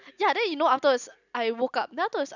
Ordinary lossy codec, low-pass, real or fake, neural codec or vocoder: none; 7.2 kHz; real; none